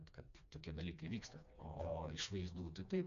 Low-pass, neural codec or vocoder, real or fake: 7.2 kHz; codec, 16 kHz, 2 kbps, FreqCodec, smaller model; fake